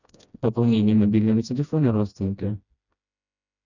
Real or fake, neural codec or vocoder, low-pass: fake; codec, 16 kHz, 1 kbps, FreqCodec, smaller model; 7.2 kHz